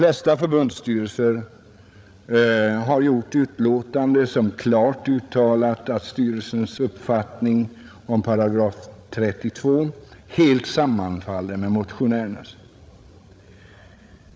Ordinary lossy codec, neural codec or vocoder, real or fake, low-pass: none; codec, 16 kHz, 16 kbps, FreqCodec, larger model; fake; none